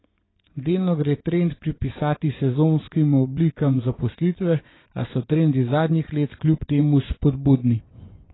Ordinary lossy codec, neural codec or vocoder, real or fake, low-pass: AAC, 16 kbps; vocoder, 24 kHz, 100 mel bands, Vocos; fake; 7.2 kHz